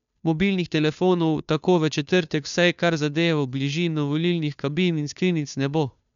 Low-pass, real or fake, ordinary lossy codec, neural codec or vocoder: 7.2 kHz; fake; none; codec, 16 kHz, 2 kbps, FunCodec, trained on Chinese and English, 25 frames a second